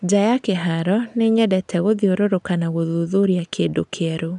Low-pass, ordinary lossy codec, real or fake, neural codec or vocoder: 10.8 kHz; none; real; none